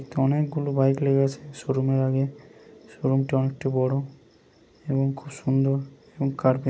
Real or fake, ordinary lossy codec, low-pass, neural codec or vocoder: real; none; none; none